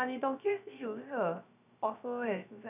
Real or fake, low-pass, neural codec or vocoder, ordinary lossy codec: fake; 3.6 kHz; codec, 16 kHz, 0.3 kbps, FocalCodec; none